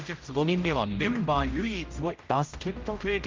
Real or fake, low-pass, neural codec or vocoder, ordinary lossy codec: fake; 7.2 kHz; codec, 16 kHz, 0.5 kbps, X-Codec, HuBERT features, trained on general audio; Opus, 24 kbps